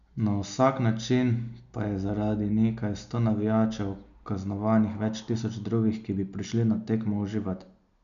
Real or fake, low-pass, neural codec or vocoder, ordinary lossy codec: real; 7.2 kHz; none; none